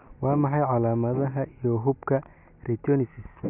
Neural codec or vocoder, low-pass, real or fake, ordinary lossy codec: none; 3.6 kHz; real; none